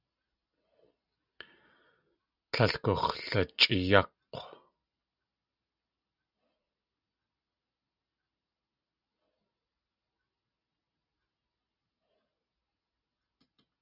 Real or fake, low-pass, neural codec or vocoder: real; 5.4 kHz; none